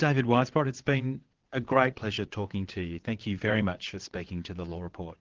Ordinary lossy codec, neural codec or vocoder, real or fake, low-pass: Opus, 16 kbps; vocoder, 22.05 kHz, 80 mel bands, WaveNeXt; fake; 7.2 kHz